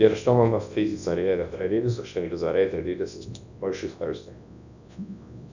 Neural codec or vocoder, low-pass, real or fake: codec, 24 kHz, 0.9 kbps, WavTokenizer, large speech release; 7.2 kHz; fake